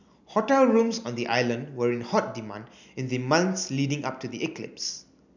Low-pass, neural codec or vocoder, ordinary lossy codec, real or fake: 7.2 kHz; none; none; real